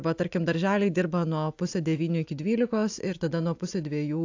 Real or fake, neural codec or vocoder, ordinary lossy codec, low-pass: real; none; AAC, 48 kbps; 7.2 kHz